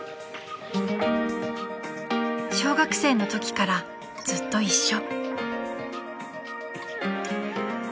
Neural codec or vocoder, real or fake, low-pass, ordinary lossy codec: none; real; none; none